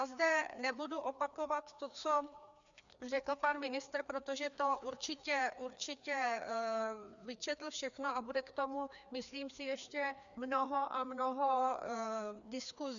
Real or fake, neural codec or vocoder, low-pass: fake; codec, 16 kHz, 2 kbps, FreqCodec, larger model; 7.2 kHz